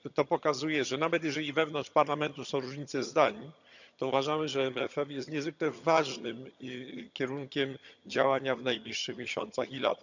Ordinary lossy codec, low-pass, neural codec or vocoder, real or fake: none; 7.2 kHz; vocoder, 22.05 kHz, 80 mel bands, HiFi-GAN; fake